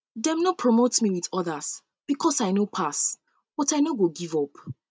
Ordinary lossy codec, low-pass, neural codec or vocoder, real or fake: none; none; none; real